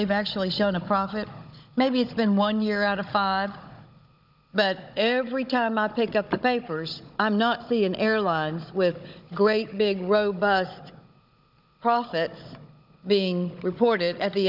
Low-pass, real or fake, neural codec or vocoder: 5.4 kHz; fake; codec, 16 kHz, 16 kbps, FunCodec, trained on Chinese and English, 50 frames a second